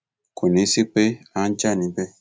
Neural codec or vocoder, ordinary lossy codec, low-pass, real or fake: none; none; none; real